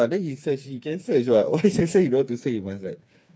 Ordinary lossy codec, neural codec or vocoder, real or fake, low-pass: none; codec, 16 kHz, 4 kbps, FreqCodec, smaller model; fake; none